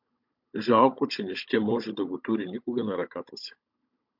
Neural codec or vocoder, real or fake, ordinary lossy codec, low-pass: codec, 16 kHz, 16 kbps, FunCodec, trained on Chinese and English, 50 frames a second; fake; MP3, 48 kbps; 5.4 kHz